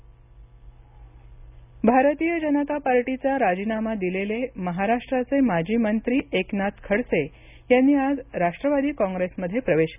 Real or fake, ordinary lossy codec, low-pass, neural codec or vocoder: real; none; 3.6 kHz; none